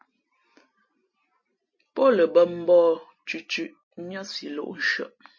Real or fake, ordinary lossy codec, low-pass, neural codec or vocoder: real; MP3, 32 kbps; 7.2 kHz; none